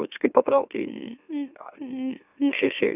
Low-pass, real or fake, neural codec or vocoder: 3.6 kHz; fake; autoencoder, 44.1 kHz, a latent of 192 numbers a frame, MeloTTS